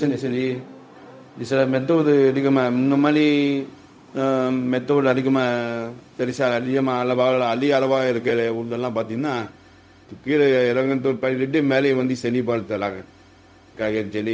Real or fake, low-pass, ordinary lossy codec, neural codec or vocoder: fake; none; none; codec, 16 kHz, 0.4 kbps, LongCat-Audio-Codec